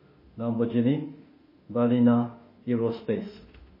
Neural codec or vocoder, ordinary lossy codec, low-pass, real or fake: autoencoder, 48 kHz, 32 numbers a frame, DAC-VAE, trained on Japanese speech; MP3, 24 kbps; 5.4 kHz; fake